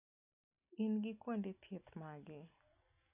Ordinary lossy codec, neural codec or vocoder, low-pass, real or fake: none; none; 3.6 kHz; real